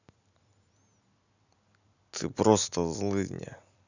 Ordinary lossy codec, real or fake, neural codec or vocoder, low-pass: none; real; none; 7.2 kHz